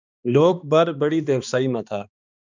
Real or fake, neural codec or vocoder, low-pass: fake; codec, 16 kHz, 2 kbps, X-Codec, HuBERT features, trained on balanced general audio; 7.2 kHz